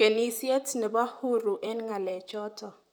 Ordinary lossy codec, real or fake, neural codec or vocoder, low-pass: none; fake; vocoder, 44.1 kHz, 128 mel bands, Pupu-Vocoder; 19.8 kHz